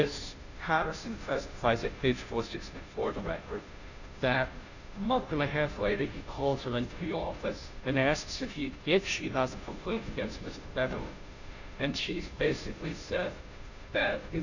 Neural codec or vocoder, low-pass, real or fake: codec, 16 kHz, 0.5 kbps, FunCodec, trained on Chinese and English, 25 frames a second; 7.2 kHz; fake